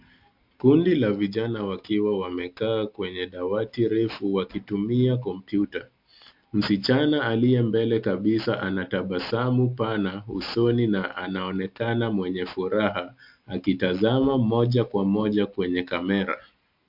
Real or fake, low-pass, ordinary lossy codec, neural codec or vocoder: real; 5.4 kHz; MP3, 48 kbps; none